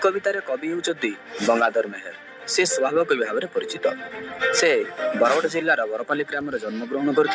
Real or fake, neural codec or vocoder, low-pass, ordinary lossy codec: real; none; none; none